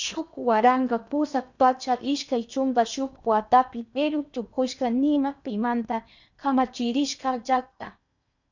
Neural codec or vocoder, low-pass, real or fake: codec, 16 kHz in and 24 kHz out, 0.8 kbps, FocalCodec, streaming, 65536 codes; 7.2 kHz; fake